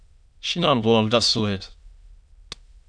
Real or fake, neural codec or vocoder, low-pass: fake; autoencoder, 22.05 kHz, a latent of 192 numbers a frame, VITS, trained on many speakers; 9.9 kHz